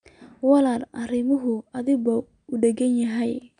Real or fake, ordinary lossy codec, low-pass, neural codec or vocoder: real; none; 9.9 kHz; none